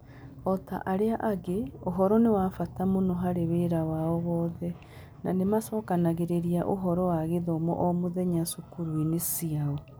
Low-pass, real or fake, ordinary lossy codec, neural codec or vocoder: none; real; none; none